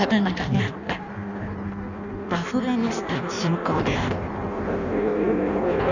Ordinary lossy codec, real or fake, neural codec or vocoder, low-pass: none; fake; codec, 16 kHz in and 24 kHz out, 0.6 kbps, FireRedTTS-2 codec; 7.2 kHz